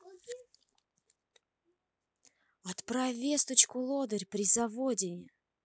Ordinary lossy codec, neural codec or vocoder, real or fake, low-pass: none; none; real; none